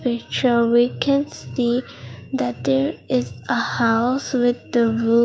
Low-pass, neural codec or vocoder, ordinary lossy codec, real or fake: none; codec, 16 kHz, 6 kbps, DAC; none; fake